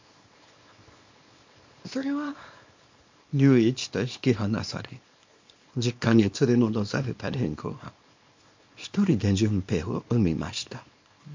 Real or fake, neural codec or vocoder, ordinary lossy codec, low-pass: fake; codec, 24 kHz, 0.9 kbps, WavTokenizer, small release; MP3, 48 kbps; 7.2 kHz